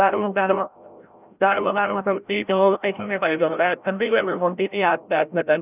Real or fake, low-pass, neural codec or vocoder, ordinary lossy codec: fake; 3.6 kHz; codec, 16 kHz, 0.5 kbps, FreqCodec, larger model; none